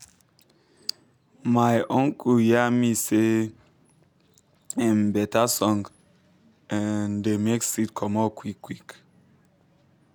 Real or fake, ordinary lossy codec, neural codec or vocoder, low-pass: real; none; none; 19.8 kHz